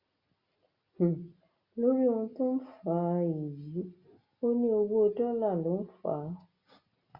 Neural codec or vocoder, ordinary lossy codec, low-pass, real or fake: none; Opus, 64 kbps; 5.4 kHz; real